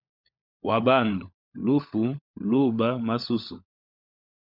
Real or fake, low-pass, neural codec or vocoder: fake; 5.4 kHz; codec, 16 kHz, 16 kbps, FunCodec, trained on LibriTTS, 50 frames a second